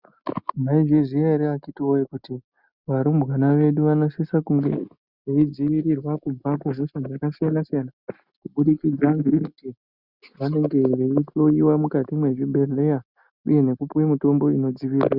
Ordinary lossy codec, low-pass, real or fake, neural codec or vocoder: Opus, 64 kbps; 5.4 kHz; real; none